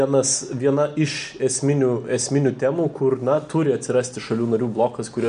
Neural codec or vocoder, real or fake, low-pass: none; real; 9.9 kHz